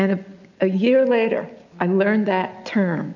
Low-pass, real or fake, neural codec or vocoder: 7.2 kHz; real; none